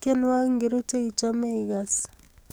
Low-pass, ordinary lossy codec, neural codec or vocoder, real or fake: none; none; codec, 44.1 kHz, 7.8 kbps, DAC; fake